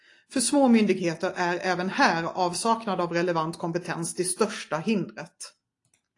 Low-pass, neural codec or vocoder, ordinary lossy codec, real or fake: 10.8 kHz; none; AAC, 48 kbps; real